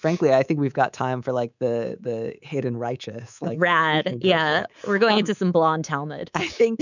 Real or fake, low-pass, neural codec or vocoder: fake; 7.2 kHz; codec, 24 kHz, 3.1 kbps, DualCodec